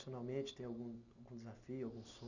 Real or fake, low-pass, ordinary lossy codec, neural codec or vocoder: real; 7.2 kHz; none; none